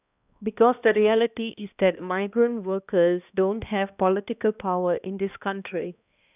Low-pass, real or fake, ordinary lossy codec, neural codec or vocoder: 3.6 kHz; fake; none; codec, 16 kHz, 1 kbps, X-Codec, HuBERT features, trained on balanced general audio